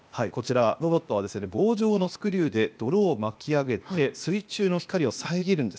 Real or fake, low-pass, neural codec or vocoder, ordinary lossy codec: fake; none; codec, 16 kHz, 0.8 kbps, ZipCodec; none